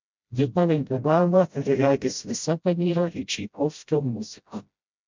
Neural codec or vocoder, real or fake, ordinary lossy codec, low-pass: codec, 16 kHz, 0.5 kbps, FreqCodec, smaller model; fake; MP3, 64 kbps; 7.2 kHz